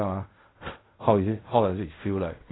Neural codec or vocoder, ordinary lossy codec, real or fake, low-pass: codec, 16 kHz in and 24 kHz out, 0.4 kbps, LongCat-Audio-Codec, fine tuned four codebook decoder; AAC, 16 kbps; fake; 7.2 kHz